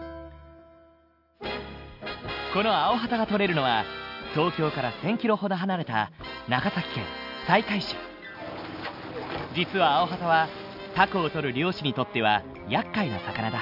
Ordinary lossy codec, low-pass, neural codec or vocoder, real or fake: AAC, 48 kbps; 5.4 kHz; none; real